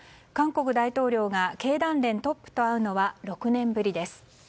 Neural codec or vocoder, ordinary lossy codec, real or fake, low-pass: none; none; real; none